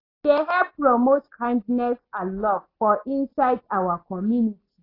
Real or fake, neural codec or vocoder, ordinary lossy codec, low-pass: real; none; AAC, 24 kbps; 5.4 kHz